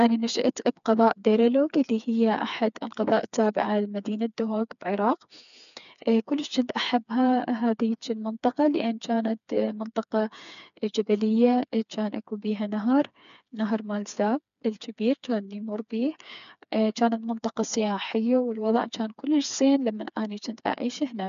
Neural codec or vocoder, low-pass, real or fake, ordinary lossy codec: codec, 16 kHz, 4 kbps, FreqCodec, smaller model; 7.2 kHz; fake; none